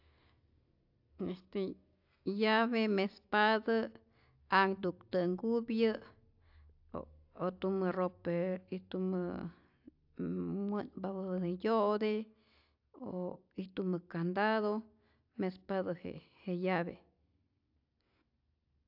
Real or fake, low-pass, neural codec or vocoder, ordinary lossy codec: real; 5.4 kHz; none; AAC, 48 kbps